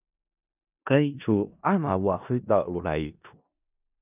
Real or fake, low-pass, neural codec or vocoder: fake; 3.6 kHz; codec, 16 kHz in and 24 kHz out, 0.4 kbps, LongCat-Audio-Codec, four codebook decoder